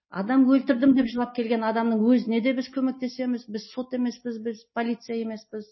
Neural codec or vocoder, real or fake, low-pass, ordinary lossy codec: none; real; 7.2 kHz; MP3, 24 kbps